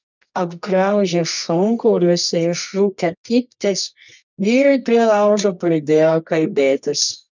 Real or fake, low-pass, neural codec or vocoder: fake; 7.2 kHz; codec, 24 kHz, 0.9 kbps, WavTokenizer, medium music audio release